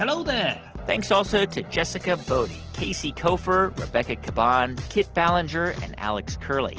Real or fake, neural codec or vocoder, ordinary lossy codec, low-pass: real; none; Opus, 16 kbps; 7.2 kHz